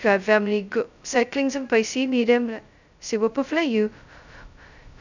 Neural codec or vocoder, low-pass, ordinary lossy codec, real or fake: codec, 16 kHz, 0.2 kbps, FocalCodec; 7.2 kHz; none; fake